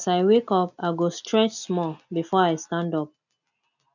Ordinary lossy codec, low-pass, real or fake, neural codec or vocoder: none; 7.2 kHz; real; none